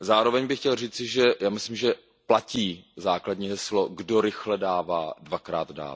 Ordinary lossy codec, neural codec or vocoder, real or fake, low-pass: none; none; real; none